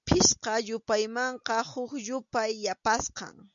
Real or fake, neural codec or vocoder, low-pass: real; none; 7.2 kHz